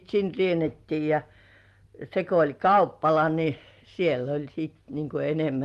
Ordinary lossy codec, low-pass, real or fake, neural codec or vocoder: Opus, 24 kbps; 14.4 kHz; real; none